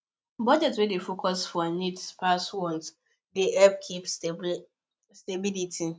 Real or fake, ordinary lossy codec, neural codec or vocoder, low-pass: real; none; none; none